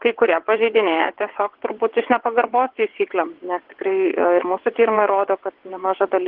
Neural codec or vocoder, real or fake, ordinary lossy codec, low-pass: vocoder, 22.05 kHz, 80 mel bands, WaveNeXt; fake; Opus, 16 kbps; 5.4 kHz